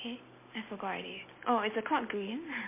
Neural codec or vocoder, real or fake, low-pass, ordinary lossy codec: none; real; 3.6 kHz; MP3, 24 kbps